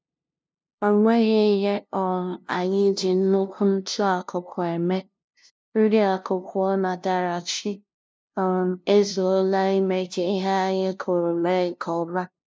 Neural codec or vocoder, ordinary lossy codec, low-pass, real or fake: codec, 16 kHz, 0.5 kbps, FunCodec, trained on LibriTTS, 25 frames a second; none; none; fake